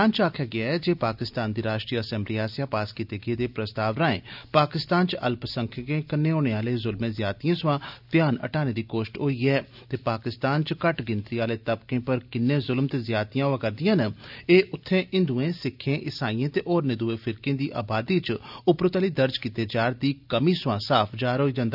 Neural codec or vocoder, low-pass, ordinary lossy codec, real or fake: none; 5.4 kHz; none; real